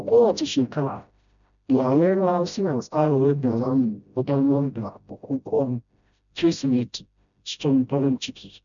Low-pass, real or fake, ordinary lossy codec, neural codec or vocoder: 7.2 kHz; fake; none; codec, 16 kHz, 0.5 kbps, FreqCodec, smaller model